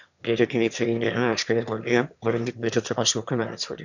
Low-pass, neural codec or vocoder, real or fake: 7.2 kHz; autoencoder, 22.05 kHz, a latent of 192 numbers a frame, VITS, trained on one speaker; fake